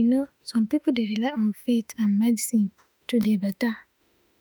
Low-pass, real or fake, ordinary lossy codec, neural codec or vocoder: none; fake; none; autoencoder, 48 kHz, 32 numbers a frame, DAC-VAE, trained on Japanese speech